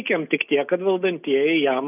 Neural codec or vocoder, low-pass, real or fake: none; 3.6 kHz; real